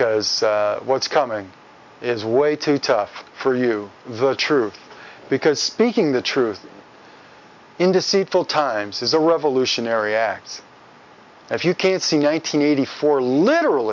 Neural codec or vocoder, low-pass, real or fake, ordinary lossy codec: none; 7.2 kHz; real; MP3, 64 kbps